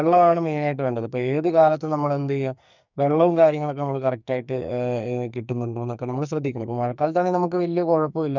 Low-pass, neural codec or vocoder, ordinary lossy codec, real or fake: 7.2 kHz; codec, 44.1 kHz, 2.6 kbps, SNAC; none; fake